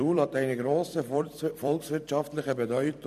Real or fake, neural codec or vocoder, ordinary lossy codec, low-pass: fake; vocoder, 44.1 kHz, 128 mel bands every 256 samples, BigVGAN v2; AAC, 96 kbps; 14.4 kHz